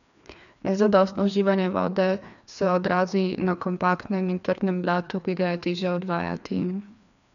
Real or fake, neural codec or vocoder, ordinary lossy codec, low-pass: fake; codec, 16 kHz, 2 kbps, FreqCodec, larger model; none; 7.2 kHz